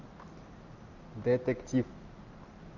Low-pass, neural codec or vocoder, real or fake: 7.2 kHz; none; real